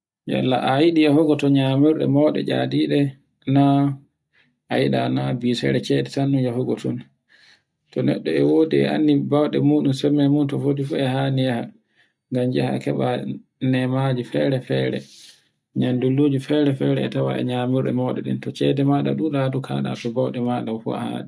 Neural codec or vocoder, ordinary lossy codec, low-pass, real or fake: none; none; none; real